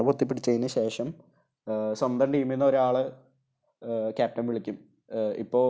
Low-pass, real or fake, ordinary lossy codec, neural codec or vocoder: none; real; none; none